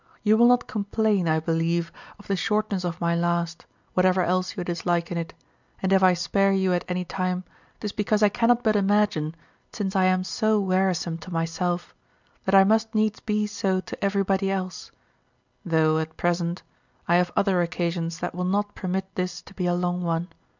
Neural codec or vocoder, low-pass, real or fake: none; 7.2 kHz; real